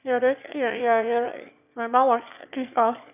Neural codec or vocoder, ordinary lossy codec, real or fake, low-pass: autoencoder, 22.05 kHz, a latent of 192 numbers a frame, VITS, trained on one speaker; none; fake; 3.6 kHz